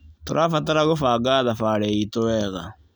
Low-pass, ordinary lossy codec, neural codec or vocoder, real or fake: none; none; none; real